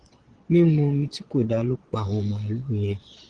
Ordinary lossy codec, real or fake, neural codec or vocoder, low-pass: Opus, 16 kbps; fake; vocoder, 22.05 kHz, 80 mel bands, Vocos; 9.9 kHz